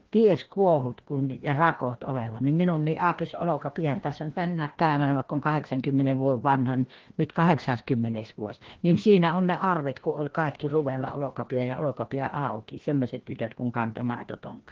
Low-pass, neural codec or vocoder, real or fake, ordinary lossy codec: 7.2 kHz; codec, 16 kHz, 1 kbps, FreqCodec, larger model; fake; Opus, 16 kbps